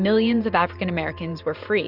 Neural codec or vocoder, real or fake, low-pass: vocoder, 44.1 kHz, 128 mel bands every 512 samples, BigVGAN v2; fake; 5.4 kHz